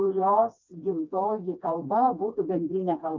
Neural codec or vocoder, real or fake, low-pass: codec, 16 kHz, 2 kbps, FreqCodec, smaller model; fake; 7.2 kHz